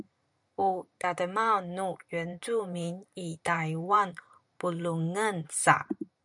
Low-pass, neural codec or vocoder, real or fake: 10.8 kHz; vocoder, 44.1 kHz, 128 mel bands every 512 samples, BigVGAN v2; fake